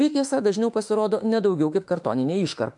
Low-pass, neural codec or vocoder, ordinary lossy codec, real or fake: 10.8 kHz; autoencoder, 48 kHz, 32 numbers a frame, DAC-VAE, trained on Japanese speech; MP3, 64 kbps; fake